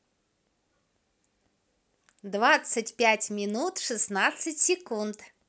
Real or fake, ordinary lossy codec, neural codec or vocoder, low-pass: real; none; none; none